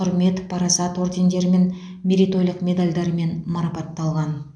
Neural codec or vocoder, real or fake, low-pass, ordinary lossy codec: none; real; 9.9 kHz; none